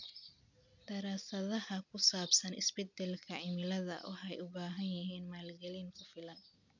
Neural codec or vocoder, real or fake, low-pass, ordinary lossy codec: none; real; 7.2 kHz; none